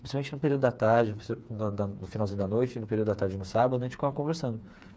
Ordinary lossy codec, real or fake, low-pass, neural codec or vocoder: none; fake; none; codec, 16 kHz, 4 kbps, FreqCodec, smaller model